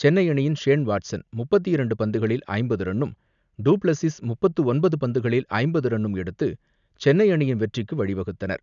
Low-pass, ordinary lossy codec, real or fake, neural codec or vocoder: 7.2 kHz; none; real; none